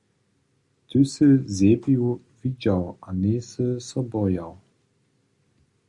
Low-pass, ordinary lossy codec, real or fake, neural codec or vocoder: 10.8 kHz; Opus, 64 kbps; real; none